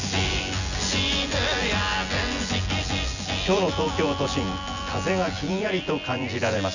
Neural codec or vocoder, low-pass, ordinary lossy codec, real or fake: vocoder, 24 kHz, 100 mel bands, Vocos; 7.2 kHz; none; fake